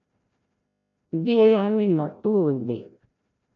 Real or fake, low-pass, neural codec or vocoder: fake; 7.2 kHz; codec, 16 kHz, 0.5 kbps, FreqCodec, larger model